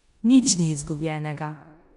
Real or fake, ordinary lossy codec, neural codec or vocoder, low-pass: fake; MP3, 96 kbps; codec, 16 kHz in and 24 kHz out, 0.9 kbps, LongCat-Audio-Codec, four codebook decoder; 10.8 kHz